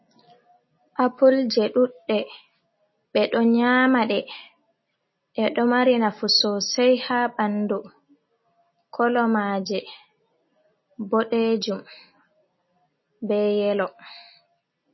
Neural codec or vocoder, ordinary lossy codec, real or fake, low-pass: none; MP3, 24 kbps; real; 7.2 kHz